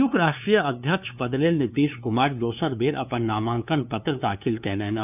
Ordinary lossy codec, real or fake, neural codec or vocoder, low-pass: none; fake; codec, 16 kHz, 2 kbps, FunCodec, trained on LibriTTS, 25 frames a second; 3.6 kHz